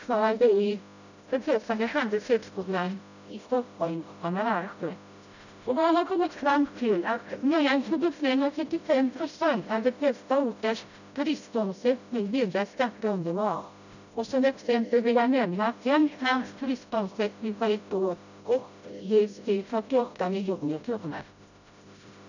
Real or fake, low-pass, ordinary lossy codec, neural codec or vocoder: fake; 7.2 kHz; none; codec, 16 kHz, 0.5 kbps, FreqCodec, smaller model